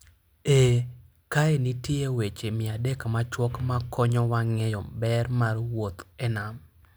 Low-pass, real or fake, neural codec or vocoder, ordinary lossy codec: none; real; none; none